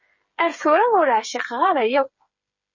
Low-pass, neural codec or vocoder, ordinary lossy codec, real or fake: 7.2 kHz; codec, 16 kHz, 8 kbps, FreqCodec, smaller model; MP3, 32 kbps; fake